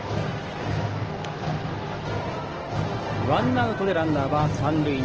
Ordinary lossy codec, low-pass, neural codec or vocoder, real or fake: Opus, 16 kbps; 7.2 kHz; none; real